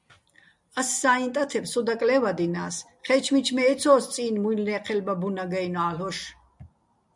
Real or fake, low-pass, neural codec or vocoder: real; 10.8 kHz; none